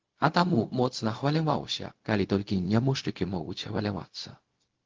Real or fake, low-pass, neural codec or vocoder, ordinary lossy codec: fake; 7.2 kHz; codec, 16 kHz, 0.4 kbps, LongCat-Audio-Codec; Opus, 16 kbps